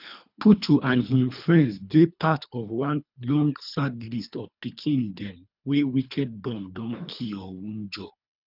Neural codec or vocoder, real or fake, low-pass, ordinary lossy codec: codec, 24 kHz, 3 kbps, HILCodec; fake; 5.4 kHz; none